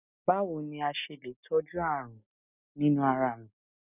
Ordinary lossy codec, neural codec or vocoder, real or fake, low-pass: none; none; real; 3.6 kHz